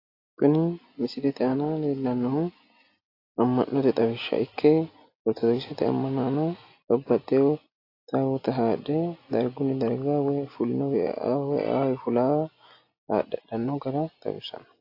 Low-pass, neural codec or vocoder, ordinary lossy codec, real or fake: 5.4 kHz; none; AAC, 32 kbps; real